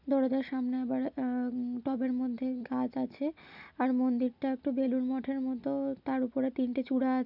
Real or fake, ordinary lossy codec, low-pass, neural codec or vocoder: real; none; 5.4 kHz; none